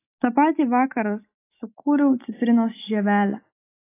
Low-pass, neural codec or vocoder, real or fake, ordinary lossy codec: 3.6 kHz; none; real; AAC, 24 kbps